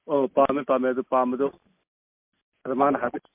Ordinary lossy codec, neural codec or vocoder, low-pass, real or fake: MP3, 32 kbps; none; 3.6 kHz; real